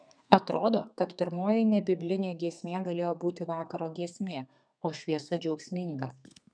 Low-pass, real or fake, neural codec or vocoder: 9.9 kHz; fake; codec, 32 kHz, 1.9 kbps, SNAC